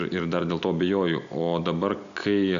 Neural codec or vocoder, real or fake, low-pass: none; real; 7.2 kHz